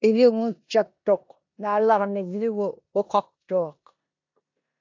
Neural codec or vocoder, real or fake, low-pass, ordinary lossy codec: codec, 16 kHz in and 24 kHz out, 0.9 kbps, LongCat-Audio-Codec, four codebook decoder; fake; 7.2 kHz; AAC, 48 kbps